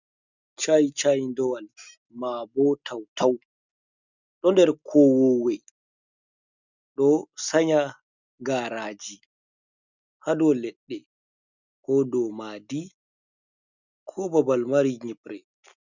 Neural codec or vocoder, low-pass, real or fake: none; 7.2 kHz; real